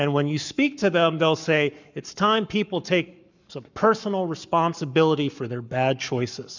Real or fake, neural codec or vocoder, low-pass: fake; codec, 44.1 kHz, 7.8 kbps, Pupu-Codec; 7.2 kHz